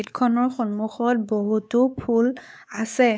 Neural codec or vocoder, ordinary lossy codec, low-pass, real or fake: codec, 16 kHz, 4 kbps, X-Codec, WavLM features, trained on Multilingual LibriSpeech; none; none; fake